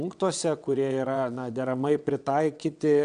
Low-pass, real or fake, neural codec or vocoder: 9.9 kHz; fake; vocoder, 22.05 kHz, 80 mel bands, WaveNeXt